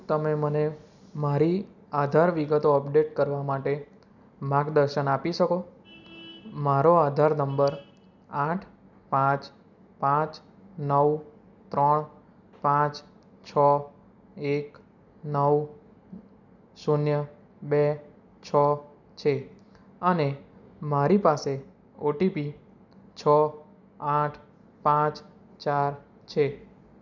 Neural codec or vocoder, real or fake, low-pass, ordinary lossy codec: none; real; 7.2 kHz; none